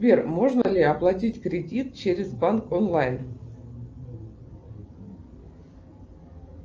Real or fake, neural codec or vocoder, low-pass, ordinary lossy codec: real; none; 7.2 kHz; Opus, 24 kbps